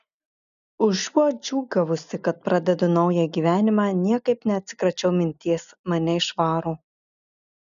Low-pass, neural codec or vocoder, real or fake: 7.2 kHz; none; real